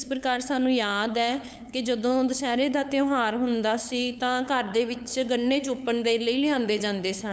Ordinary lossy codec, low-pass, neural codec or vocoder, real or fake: none; none; codec, 16 kHz, 8 kbps, FunCodec, trained on LibriTTS, 25 frames a second; fake